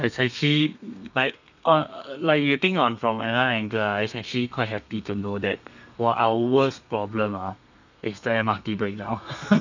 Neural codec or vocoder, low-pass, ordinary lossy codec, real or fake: codec, 32 kHz, 1.9 kbps, SNAC; 7.2 kHz; none; fake